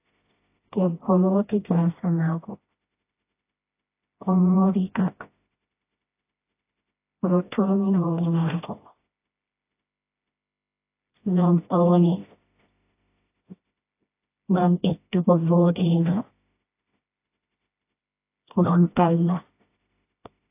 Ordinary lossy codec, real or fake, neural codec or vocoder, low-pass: AAC, 24 kbps; fake; codec, 16 kHz, 1 kbps, FreqCodec, smaller model; 3.6 kHz